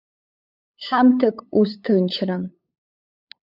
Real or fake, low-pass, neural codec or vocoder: fake; 5.4 kHz; codec, 16 kHz, 8 kbps, FunCodec, trained on LibriTTS, 25 frames a second